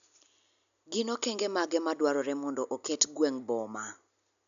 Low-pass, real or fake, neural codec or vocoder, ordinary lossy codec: 7.2 kHz; real; none; none